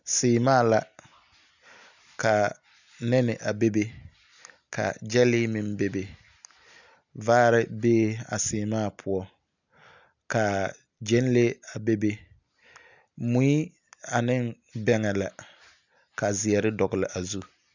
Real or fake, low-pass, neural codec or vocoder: real; 7.2 kHz; none